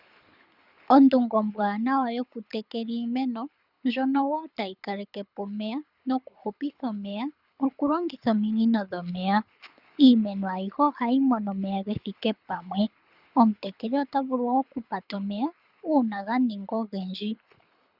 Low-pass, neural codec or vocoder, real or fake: 5.4 kHz; codec, 24 kHz, 6 kbps, HILCodec; fake